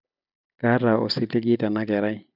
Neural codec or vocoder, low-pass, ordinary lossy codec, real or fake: none; 5.4 kHz; none; real